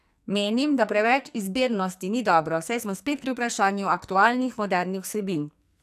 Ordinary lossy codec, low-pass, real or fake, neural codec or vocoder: none; 14.4 kHz; fake; codec, 44.1 kHz, 2.6 kbps, SNAC